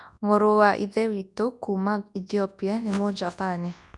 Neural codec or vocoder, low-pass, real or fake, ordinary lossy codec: codec, 24 kHz, 0.9 kbps, WavTokenizer, large speech release; 10.8 kHz; fake; none